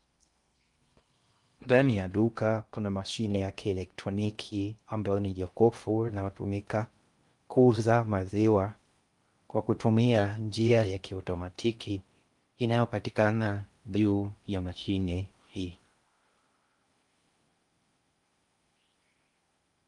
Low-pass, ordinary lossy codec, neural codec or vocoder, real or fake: 10.8 kHz; Opus, 32 kbps; codec, 16 kHz in and 24 kHz out, 0.6 kbps, FocalCodec, streaming, 2048 codes; fake